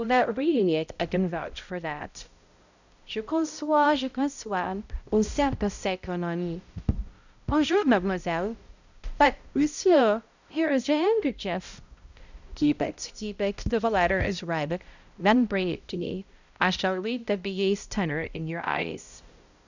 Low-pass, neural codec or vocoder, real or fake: 7.2 kHz; codec, 16 kHz, 0.5 kbps, X-Codec, HuBERT features, trained on balanced general audio; fake